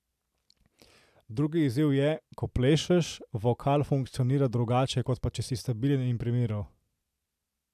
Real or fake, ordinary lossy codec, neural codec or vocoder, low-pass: real; AAC, 96 kbps; none; 14.4 kHz